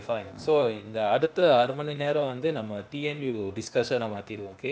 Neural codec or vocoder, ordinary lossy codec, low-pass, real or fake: codec, 16 kHz, 0.8 kbps, ZipCodec; none; none; fake